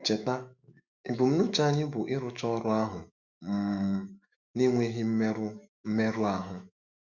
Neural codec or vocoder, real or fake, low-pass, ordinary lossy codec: autoencoder, 48 kHz, 128 numbers a frame, DAC-VAE, trained on Japanese speech; fake; 7.2 kHz; Opus, 64 kbps